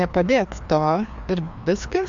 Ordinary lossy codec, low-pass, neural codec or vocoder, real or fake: MP3, 64 kbps; 7.2 kHz; codec, 16 kHz, 2 kbps, FreqCodec, larger model; fake